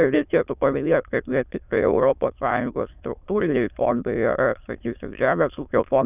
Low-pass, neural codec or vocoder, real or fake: 3.6 kHz; autoencoder, 22.05 kHz, a latent of 192 numbers a frame, VITS, trained on many speakers; fake